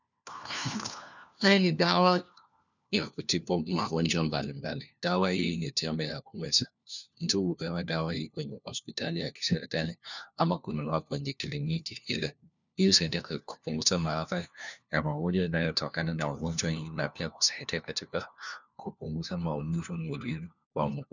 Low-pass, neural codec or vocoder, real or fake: 7.2 kHz; codec, 16 kHz, 1 kbps, FunCodec, trained on LibriTTS, 50 frames a second; fake